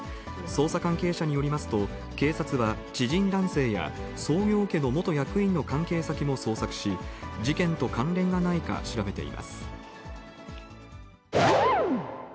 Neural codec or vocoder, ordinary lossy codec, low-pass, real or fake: none; none; none; real